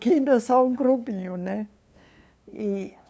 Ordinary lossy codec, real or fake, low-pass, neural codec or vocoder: none; fake; none; codec, 16 kHz, 2 kbps, FunCodec, trained on LibriTTS, 25 frames a second